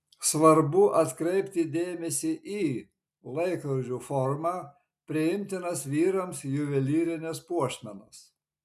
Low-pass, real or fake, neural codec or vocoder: 14.4 kHz; real; none